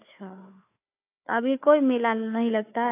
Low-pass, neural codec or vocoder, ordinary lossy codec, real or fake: 3.6 kHz; codec, 16 kHz, 4 kbps, FunCodec, trained on Chinese and English, 50 frames a second; AAC, 24 kbps; fake